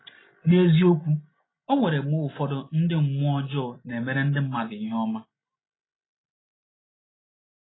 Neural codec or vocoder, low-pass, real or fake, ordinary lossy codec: none; 7.2 kHz; real; AAC, 16 kbps